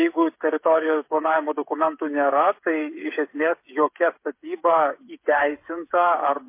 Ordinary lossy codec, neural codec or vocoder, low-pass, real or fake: MP3, 24 kbps; codec, 16 kHz, 8 kbps, FreqCodec, smaller model; 3.6 kHz; fake